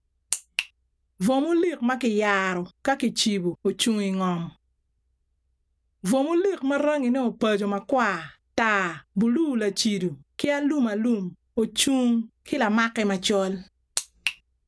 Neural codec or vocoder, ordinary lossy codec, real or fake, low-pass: none; none; real; none